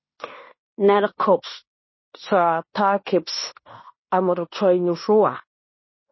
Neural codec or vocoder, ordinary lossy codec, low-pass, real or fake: codec, 16 kHz in and 24 kHz out, 0.9 kbps, LongCat-Audio-Codec, fine tuned four codebook decoder; MP3, 24 kbps; 7.2 kHz; fake